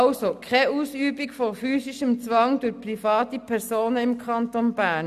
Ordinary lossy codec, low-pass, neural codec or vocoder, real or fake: none; 14.4 kHz; none; real